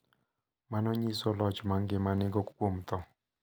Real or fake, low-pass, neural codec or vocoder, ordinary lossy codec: real; none; none; none